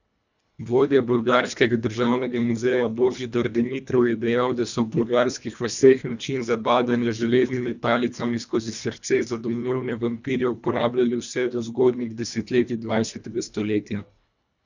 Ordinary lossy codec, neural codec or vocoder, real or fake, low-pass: none; codec, 24 kHz, 1.5 kbps, HILCodec; fake; 7.2 kHz